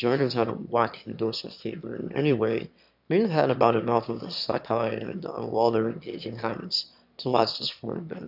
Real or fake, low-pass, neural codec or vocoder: fake; 5.4 kHz; autoencoder, 22.05 kHz, a latent of 192 numbers a frame, VITS, trained on one speaker